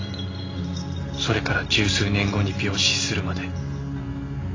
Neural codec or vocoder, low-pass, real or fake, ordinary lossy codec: none; 7.2 kHz; real; AAC, 32 kbps